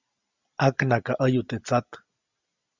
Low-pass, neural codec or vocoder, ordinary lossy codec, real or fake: 7.2 kHz; none; Opus, 64 kbps; real